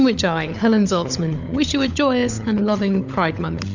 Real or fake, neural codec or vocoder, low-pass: fake; codec, 16 kHz, 16 kbps, FunCodec, trained on LibriTTS, 50 frames a second; 7.2 kHz